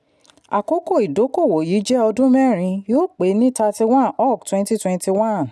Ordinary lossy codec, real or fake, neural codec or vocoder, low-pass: none; real; none; none